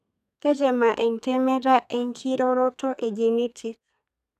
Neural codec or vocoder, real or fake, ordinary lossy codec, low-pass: codec, 32 kHz, 1.9 kbps, SNAC; fake; none; 14.4 kHz